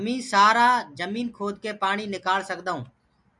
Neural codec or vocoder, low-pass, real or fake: none; 10.8 kHz; real